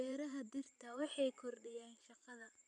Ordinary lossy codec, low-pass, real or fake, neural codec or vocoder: none; 10.8 kHz; fake; vocoder, 44.1 kHz, 128 mel bands every 512 samples, BigVGAN v2